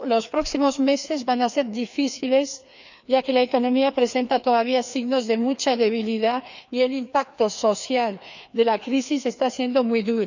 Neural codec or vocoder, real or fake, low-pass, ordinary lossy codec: codec, 16 kHz, 2 kbps, FreqCodec, larger model; fake; 7.2 kHz; none